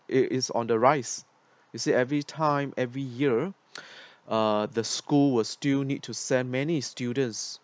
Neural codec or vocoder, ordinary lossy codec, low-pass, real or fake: none; none; none; real